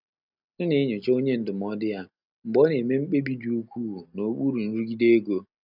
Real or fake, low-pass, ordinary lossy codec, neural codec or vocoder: real; 5.4 kHz; none; none